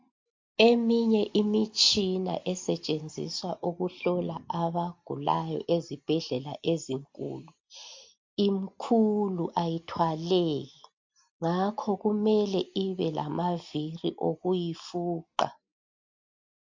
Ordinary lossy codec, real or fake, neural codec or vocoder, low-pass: MP3, 48 kbps; real; none; 7.2 kHz